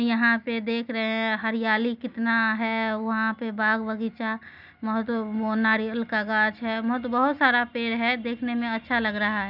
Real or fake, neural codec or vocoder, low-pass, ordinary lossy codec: real; none; 5.4 kHz; none